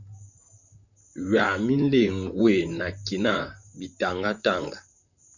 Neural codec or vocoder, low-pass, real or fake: vocoder, 44.1 kHz, 128 mel bands, Pupu-Vocoder; 7.2 kHz; fake